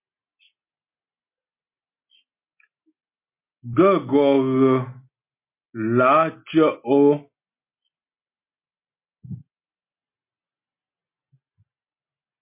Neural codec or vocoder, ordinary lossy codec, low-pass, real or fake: none; MP3, 24 kbps; 3.6 kHz; real